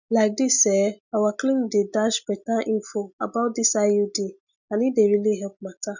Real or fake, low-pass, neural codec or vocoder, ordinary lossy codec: real; 7.2 kHz; none; none